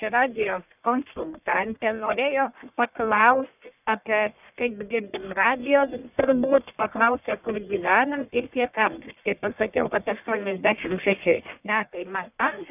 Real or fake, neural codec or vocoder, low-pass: fake; codec, 44.1 kHz, 1.7 kbps, Pupu-Codec; 3.6 kHz